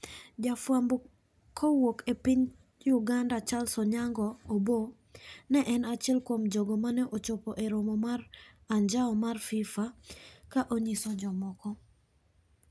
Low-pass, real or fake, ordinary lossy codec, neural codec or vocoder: none; real; none; none